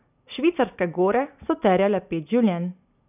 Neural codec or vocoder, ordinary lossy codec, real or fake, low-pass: none; none; real; 3.6 kHz